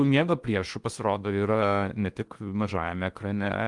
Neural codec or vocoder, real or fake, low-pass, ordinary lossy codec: codec, 16 kHz in and 24 kHz out, 0.8 kbps, FocalCodec, streaming, 65536 codes; fake; 10.8 kHz; Opus, 32 kbps